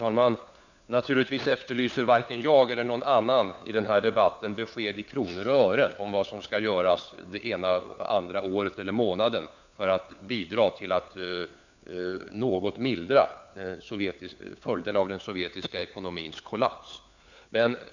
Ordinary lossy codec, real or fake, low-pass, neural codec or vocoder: none; fake; 7.2 kHz; codec, 16 kHz, 4 kbps, FunCodec, trained on LibriTTS, 50 frames a second